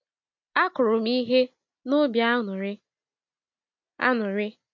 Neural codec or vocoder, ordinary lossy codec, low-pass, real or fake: none; MP3, 48 kbps; 7.2 kHz; real